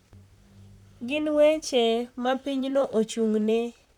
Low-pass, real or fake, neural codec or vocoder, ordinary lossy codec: 19.8 kHz; fake; vocoder, 44.1 kHz, 128 mel bands, Pupu-Vocoder; none